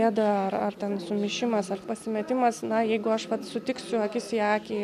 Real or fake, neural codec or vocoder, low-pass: real; none; 14.4 kHz